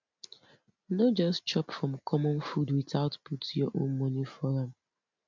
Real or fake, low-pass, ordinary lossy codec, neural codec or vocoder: real; 7.2 kHz; MP3, 64 kbps; none